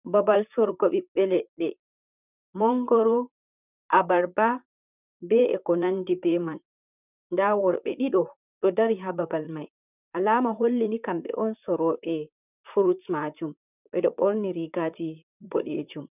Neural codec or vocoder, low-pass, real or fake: vocoder, 44.1 kHz, 128 mel bands, Pupu-Vocoder; 3.6 kHz; fake